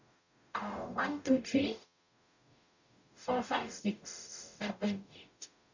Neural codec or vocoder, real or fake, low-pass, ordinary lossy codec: codec, 44.1 kHz, 0.9 kbps, DAC; fake; 7.2 kHz; none